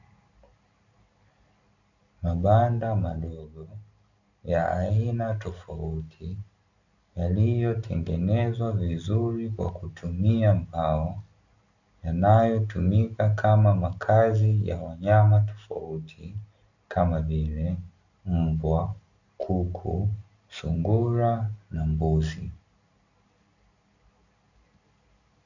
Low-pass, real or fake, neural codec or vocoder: 7.2 kHz; real; none